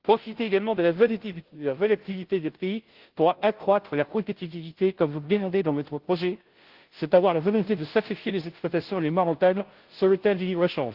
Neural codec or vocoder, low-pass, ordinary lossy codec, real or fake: codec, 16 kHz, 0.5 kbps, FunCodec, trained on Chinese and English, 25 frames a second; 5.4 kHz; Opus, 16 kbps; fake